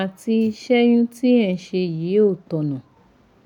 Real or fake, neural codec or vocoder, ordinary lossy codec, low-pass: real; none; none; 19.8 kHz